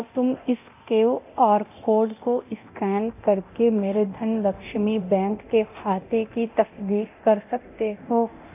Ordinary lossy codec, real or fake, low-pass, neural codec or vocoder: AAC, 32 kbps; fake; 3.6 kHz; codec, 24 kHz, 0.9 kbps, DualCodec